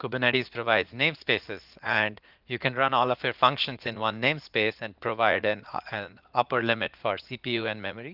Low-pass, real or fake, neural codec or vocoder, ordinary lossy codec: 5.4 kHz; fake; vocoder, 44.1 kHz, 80 mel bands, Vocos; Opus, 24 kbps